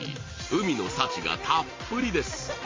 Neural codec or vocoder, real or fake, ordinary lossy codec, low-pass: none; real; MP3, 32 kbps; 7.2 kHz